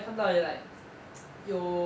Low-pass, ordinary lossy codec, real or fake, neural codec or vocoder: none; none; real; none